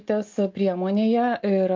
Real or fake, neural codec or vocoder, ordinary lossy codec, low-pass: real; none; Opus, 32 kbps; 7.2 kHz